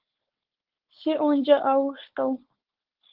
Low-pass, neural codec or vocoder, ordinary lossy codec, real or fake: 5.4 kHz; codec, 16 kHz, 4.8 kbps, FACodec; Opus, 16 kbps; fake